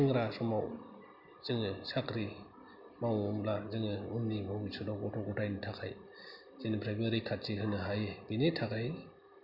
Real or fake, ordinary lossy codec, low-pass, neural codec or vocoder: real; none; 5.4 kHz; none